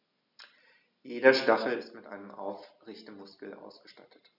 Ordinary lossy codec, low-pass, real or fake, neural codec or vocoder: none; 5.4 kHz; real; none